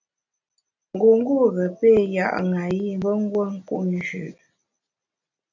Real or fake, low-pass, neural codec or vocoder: real; 7.2 kHz; none